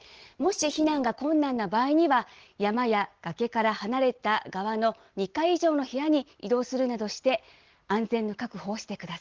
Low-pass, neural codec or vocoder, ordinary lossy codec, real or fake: 7.2 kHz; none; Opus, 16 kbps; real